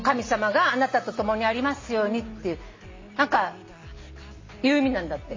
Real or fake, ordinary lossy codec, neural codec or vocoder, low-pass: real; MP3, 32 kbps; none; 7.2 kHz